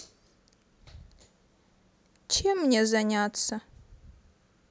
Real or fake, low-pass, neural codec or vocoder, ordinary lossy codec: real; none; none; none